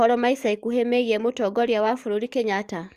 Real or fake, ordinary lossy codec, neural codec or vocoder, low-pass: fake; Opus, 64 kbps; codec, 44.1 kHz, 7.8 kbps, DAC; 14.4 kHz